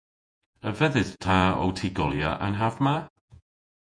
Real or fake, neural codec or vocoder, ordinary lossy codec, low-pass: fake; vocoder, 48 kHz, 128 mel bands, Vocos; MP3, 64 kbps; 9.9 kHz